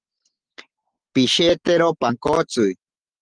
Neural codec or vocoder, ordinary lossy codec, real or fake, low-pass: none; Opus, 16 kbps; real; 9.9 kHz